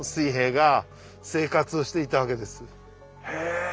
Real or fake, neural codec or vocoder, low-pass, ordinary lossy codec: real; none; none; none